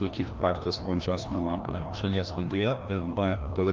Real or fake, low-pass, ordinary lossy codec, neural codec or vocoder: fake; 7.2 kHz; Opus, 24 kbps; codec, 16 kHz, 1 kbps, FreqCodec, larger model